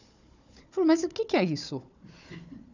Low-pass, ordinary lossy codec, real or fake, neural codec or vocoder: 7.2 kHz; none; fake; codec, 16 kHz, 4 kbps, FunCodec, trained on Chinese and English, 50 frames a second